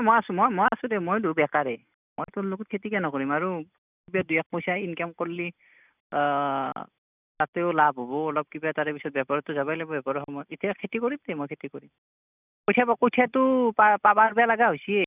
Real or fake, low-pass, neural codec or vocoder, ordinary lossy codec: real; 3.6 kHz; none; none